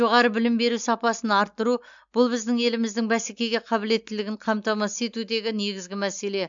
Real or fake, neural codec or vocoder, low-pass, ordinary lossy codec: real; none; 7.2 kHz; MP3, 64 kbps